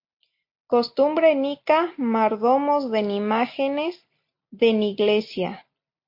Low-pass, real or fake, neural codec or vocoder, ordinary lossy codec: 5.4 kHz; real; none; MP3, 32 kbps